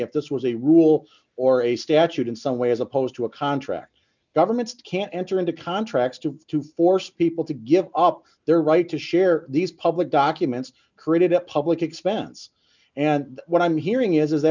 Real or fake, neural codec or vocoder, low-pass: real; none; 7.2 kHz